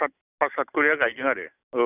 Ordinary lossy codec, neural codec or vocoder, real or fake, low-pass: none; none; real; 3.6 kHz